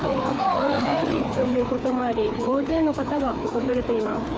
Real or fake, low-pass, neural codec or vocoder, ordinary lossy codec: fake; none; codec, 16 kHz, 4 kbps, FreqCodec, larger model; none